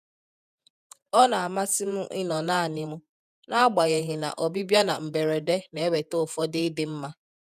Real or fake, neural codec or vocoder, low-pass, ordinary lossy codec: fake; vocoder, 48 kHz, 128 mel bands, Vocos; 14.4 kHz; none